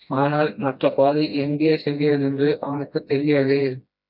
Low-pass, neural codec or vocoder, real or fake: 5.4 kHz; codec, 16 kHz, 1 kbps, FreqCodec, smaller model; fake